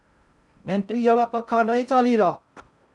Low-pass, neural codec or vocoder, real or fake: 10.8 kHz; codec, 16 kHz in and 24 kHz out, 0.6 kbps, FocalCodec, streaming, 4096 codes; fake